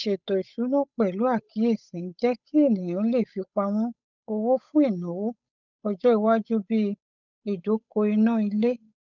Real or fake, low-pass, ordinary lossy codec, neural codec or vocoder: fake; 7.2 kHz; none; codec, 16 kHz, 8 kbps, FunCodec, trained on Chinese and English, 25 frames a second